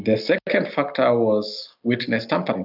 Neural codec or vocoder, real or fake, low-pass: none; real; 5.4 kHz